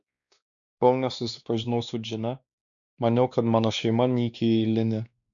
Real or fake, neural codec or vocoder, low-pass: fake; codec, 16 kHz, 2 kbps, X-Codec, WavLM features, trained on Multilingual LibriSpeech; 7.2 kHz